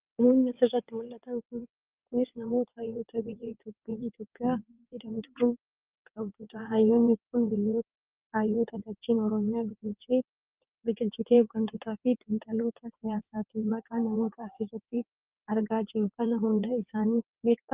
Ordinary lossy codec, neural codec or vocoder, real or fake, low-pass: Opus, 32 kbps; vocoder, 22.05 kHz, 80 mel bands, WaveNeXt; fake; 3.6 kHz